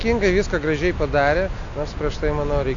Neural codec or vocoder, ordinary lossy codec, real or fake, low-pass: none; AAC, 48 kbps; real; 7.2 kHz